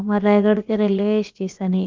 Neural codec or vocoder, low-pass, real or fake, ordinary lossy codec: codec, 16 kHz, about 1 kbps, DyCAST, with the encoder's durations; 7.2 kHz; fake; Opus, 24 kbps